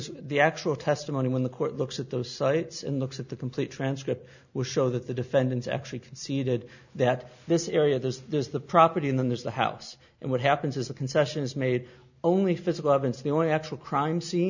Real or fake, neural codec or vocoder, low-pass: real; none; 7.2 kHz